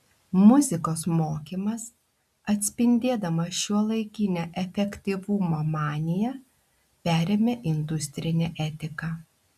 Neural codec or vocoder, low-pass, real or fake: none; 14.4 kHz; real